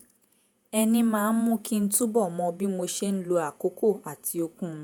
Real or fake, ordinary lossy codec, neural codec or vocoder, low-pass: fake; none; vocoder, 48 kHz, 128 mel bands, Vocos; none